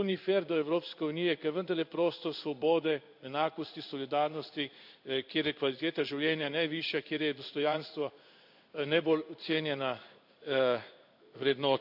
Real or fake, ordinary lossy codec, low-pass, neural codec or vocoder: fake; AAC, 48 kbps; 5.4 kHz; codec, 16 kHz in and 24 kHz out, 1 kbps, XY-Tokenizer